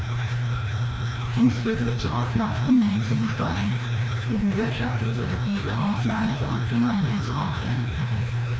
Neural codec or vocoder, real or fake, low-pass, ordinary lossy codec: codec, 16 kHz, 1 kbps, FreqCodec, larger model; fake; none; none